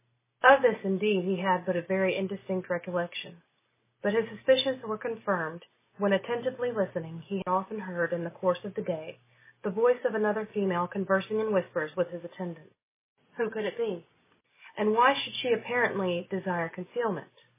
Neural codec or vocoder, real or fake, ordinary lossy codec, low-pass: none; real; MP3, 16 kbps; 3.6 kHz